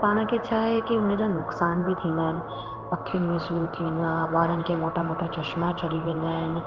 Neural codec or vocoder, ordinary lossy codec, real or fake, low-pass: codec, 16 kHz in and 24 kHz out, 1 kbps, XY-Tokenizer; Opus, 32 kbps; fake; 7.2 kHz